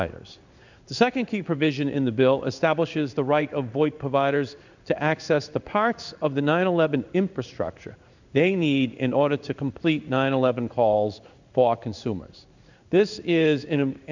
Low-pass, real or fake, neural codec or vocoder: 7.2 kHz; fake; codec, 16 kHz in and 24 kHz out, 1 kbps, XY-Tokenizer